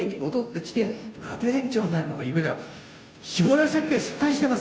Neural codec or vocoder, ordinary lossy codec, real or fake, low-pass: codec, 16 kHz, 0.5 kbps, FunCodec, trained on Chinese and English, 25 frames a second; none; fake; none